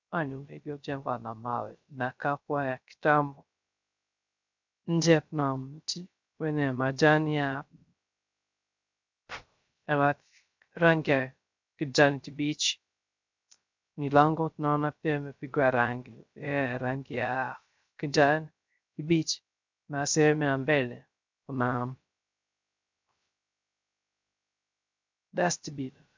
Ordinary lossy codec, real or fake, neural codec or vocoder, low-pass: MP3, 64 kbps; fake; codec, 16 kHz, 0.3 kbps, FocalCodec; 7.2 kHz